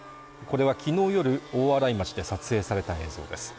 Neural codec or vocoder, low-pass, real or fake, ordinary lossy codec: none; none; real; none